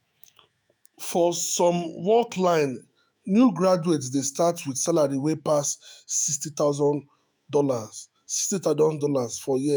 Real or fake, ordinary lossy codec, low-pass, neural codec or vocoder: fake; none; none; autoencoder, 48 kHz, 128 numbers a frame, DAC-VAE, trained on Japanese speech